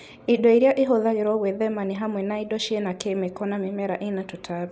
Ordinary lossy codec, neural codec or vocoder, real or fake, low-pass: none; none; real; none